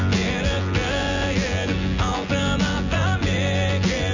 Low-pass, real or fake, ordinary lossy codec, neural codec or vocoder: 7.2 kHz; fake; none; vocoder, 24 kHz, 100 mel bands, Vocos